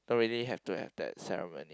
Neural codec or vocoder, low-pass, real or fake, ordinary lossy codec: none; none; real; none